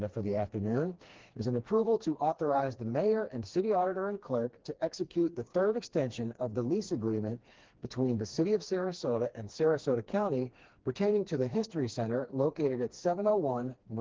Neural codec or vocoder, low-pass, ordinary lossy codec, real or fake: codec, 16 kHz, 2 kbps, FreqCodec, smaller model; 7.2 kHz; Opus, 16 kbps; fake